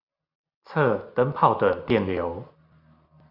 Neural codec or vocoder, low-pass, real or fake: none; 5.4 kHz; real